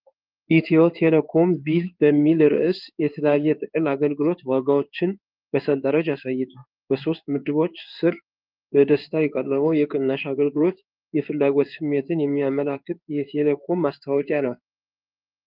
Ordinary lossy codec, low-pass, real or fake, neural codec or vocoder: Opus, 24 kbps; 5.4 kHz; fake; codec, 16 kHz in and 24 kHz out, 1 kbps, XY-Tokenizer